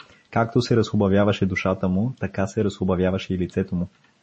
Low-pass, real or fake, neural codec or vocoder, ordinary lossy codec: 10.8 kHz; real; none; MP3, 32 kbps